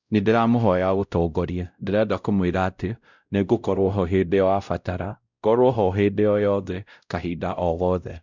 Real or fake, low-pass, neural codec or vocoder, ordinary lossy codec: fake; 7.2 kHz; codec, 16 kHz, 0.5 kbps, X-Codec, WavLM features, trained on Multilingual LibriSpeech; none